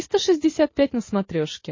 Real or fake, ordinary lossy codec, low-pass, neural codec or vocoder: real; MP3, 32 kbps; 7.2 kHz; none